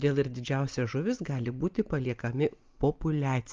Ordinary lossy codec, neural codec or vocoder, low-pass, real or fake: Opus, 32 kbps; none; 7.2 kHz; real